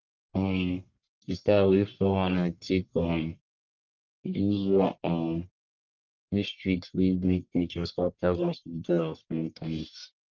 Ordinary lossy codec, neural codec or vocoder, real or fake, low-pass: Opus, 24 kbps; codec, 44.1 kHz, 1.7 kbps, Pupu-Codec; fake; 7.2 kHz